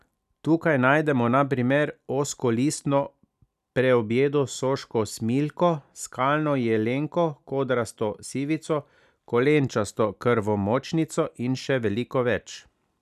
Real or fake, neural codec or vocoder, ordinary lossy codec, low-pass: real; none; none; 14.4 kHz